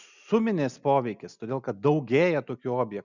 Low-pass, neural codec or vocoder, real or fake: 7.2 kHz; none; real